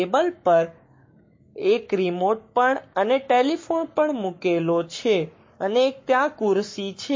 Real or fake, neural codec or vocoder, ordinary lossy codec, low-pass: fake; codec, 44.1 kHz, 7.8 kbps, Pupu-Codec; MP3, 32 kbps; 7.2 kHz